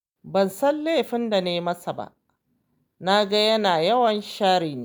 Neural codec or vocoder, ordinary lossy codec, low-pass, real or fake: none; none; none; real